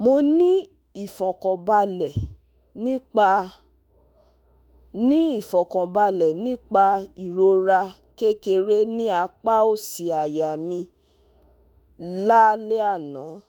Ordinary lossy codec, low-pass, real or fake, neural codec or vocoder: none; none; fake; autoencoder, 48 kHz, 32 numbers a frame, DAC-VAE, trained on Japanese speech